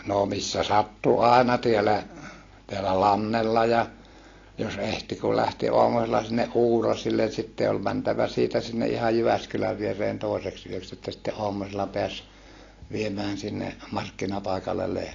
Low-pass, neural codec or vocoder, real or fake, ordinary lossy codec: 7.2 kHz; none; real; AAC, 32 kbps